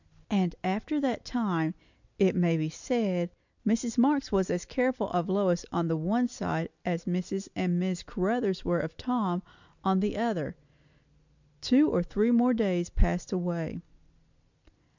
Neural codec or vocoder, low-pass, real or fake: none; 7.2 kHz; real